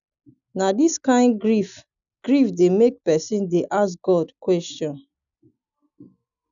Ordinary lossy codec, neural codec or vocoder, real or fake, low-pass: none; none; real; 7.2 kHz